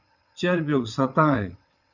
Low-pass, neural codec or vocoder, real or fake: 7.2 kHz; vocoder, 22.05 kHz, 80 mel bands, WaveNeXt; fake